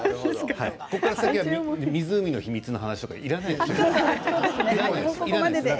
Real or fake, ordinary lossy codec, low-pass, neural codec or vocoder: real; none; none; none